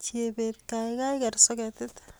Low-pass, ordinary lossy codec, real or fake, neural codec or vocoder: none; none; real; none